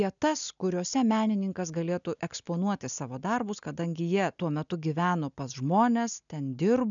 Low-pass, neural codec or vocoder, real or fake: 7.2 kHz; none; real